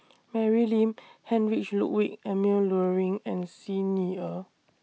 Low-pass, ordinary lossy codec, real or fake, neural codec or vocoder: none; none; real; none